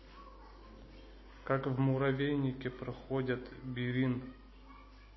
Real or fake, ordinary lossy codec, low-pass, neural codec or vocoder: real; MP3, 24 kbps; 7.2 kHz; none